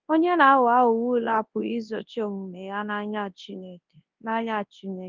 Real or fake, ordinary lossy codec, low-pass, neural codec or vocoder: fake; Opus, 32 kbps; 7.2 kHz; codec, 24 kHz, 0.9 kbps, WavTokenizer, large speech release